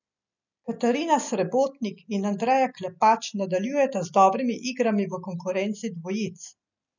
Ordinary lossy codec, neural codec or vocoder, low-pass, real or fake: none; none; 7.2 kHz; real